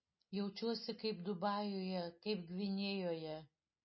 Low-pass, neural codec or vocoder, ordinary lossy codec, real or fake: 7.2 kHz; none; MP3, 24 kbps; real